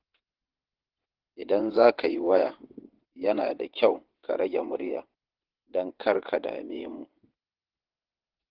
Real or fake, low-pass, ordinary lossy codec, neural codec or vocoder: fake; 5.4 kHz; Opus, 16 kbps; vocoder, 22.05 kHz, 80 mel bands, WaveNeXt